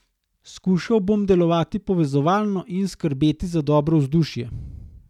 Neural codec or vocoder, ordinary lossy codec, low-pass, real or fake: none; none; 19.8 kHz; real